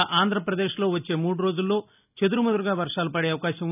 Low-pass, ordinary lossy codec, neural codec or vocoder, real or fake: 3.6 kHz; none; none; real